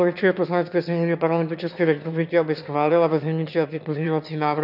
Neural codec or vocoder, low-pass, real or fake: autoencoder, 22.05 kHz, a latent of 192 numbers a frame, VITS, trained on one speaker; 5.4 kHz; fake